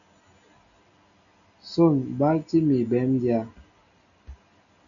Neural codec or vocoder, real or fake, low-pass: none; real; 7.2 kHz